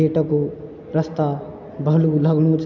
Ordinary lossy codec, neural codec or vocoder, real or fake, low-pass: none; none; real; 7.2 kHz